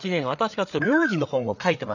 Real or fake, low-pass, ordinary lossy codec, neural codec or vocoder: fake; 7.2 kHz; none; codec, 16 kHz, 8 kbps, FreqCodec, larger model